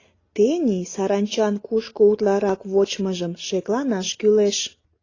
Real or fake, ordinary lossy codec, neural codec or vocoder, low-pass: real; AAC, 32 kbps; none; 7.2 kHz